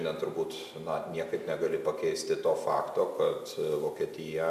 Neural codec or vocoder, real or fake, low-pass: none; real; 14.4 kHz